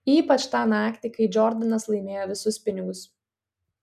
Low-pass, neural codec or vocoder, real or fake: 14.4 kHz; none; real